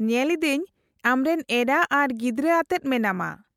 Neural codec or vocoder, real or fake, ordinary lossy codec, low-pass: none; real; MP3, 96 kbps; 19.8 kHz